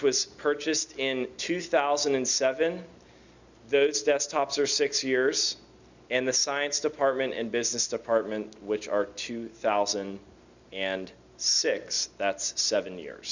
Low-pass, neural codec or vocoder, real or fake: 7.2 kHz; none; real